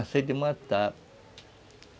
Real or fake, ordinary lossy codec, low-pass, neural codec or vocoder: real; none; none; none